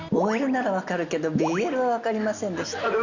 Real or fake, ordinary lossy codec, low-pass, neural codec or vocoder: real; Opus, 64 kbps; 7.2 kHz; none